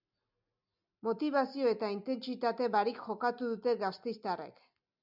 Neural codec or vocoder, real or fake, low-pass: none; real; 5.4 kHz